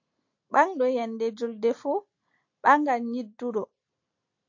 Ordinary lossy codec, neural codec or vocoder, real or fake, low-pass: AAC, 48 kbps; none; real; 7.2 kHz